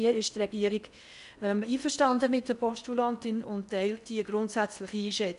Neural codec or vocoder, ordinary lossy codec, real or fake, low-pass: codec, 16 kHz in and 24 kHz out, 0.6 kbps, FocalCodec, streaming, 4096 codes; none; fake; 10.8 kHz